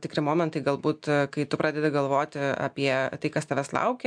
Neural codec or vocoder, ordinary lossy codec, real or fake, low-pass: none; MP3, 64 kbps; real; 9.9 kHz